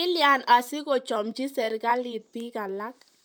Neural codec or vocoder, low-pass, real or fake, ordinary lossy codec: vocoder, 44.1 kHz, 128 mel bands, Pupu-Vocoder; none; fake; none